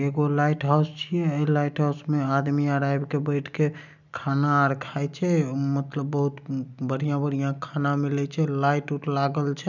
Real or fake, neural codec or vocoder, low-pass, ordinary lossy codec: real; none; 7.2 kHz; none